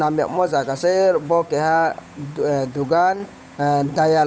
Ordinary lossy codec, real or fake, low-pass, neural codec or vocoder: none; fake; none; codec, 16 kHz, 8 kbps, FunCodec, trained on Chinese and English, 25 frames a second